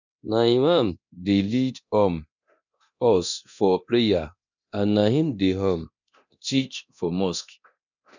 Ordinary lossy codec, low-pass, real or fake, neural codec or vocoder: none; 7.2 kHz; fake; codec, 24 kHz, 0.9 kbps, DualCodec